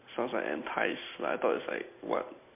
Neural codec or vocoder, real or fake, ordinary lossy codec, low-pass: none; real; MP3, 24 kbps; 3.6 kHz